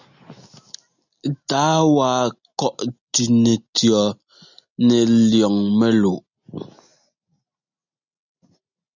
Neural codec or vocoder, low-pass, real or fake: none; 7.2 kHz; real